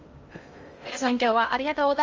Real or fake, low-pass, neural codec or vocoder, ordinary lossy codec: fake; 7.2 kHz; codec, 16 kHz in and 24 kHz out, 0.6 kbps, FocalCodec, streaming, 2048 codes; Opus, 32 kbps